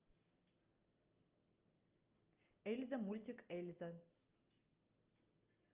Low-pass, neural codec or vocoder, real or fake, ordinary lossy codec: 3.6 kHz; none; real; Opus, 24 kbps